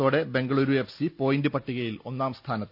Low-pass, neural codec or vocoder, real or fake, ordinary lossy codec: 5.4 kHz; none; real; none